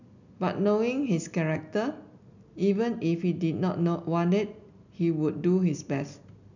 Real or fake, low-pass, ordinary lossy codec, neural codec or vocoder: real; 7.2 kHz; none; none